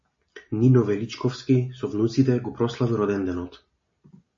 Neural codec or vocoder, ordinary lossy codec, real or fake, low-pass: none; MP3, 32 kbps; real; 7.2 kHz